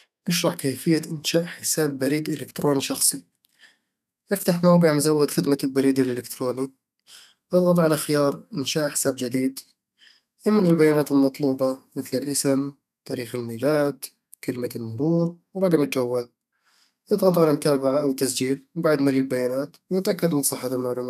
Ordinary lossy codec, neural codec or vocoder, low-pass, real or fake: none; codec, 32 kHz, 1.9 kbps, SNAC; 14.4 kHz; fake